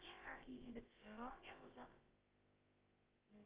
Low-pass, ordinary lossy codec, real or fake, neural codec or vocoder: 3.6 kHz; Opus, 24 kbps; fake; codec, 16 kHz, about 1 kbps, DyCAST, with the encoder's durations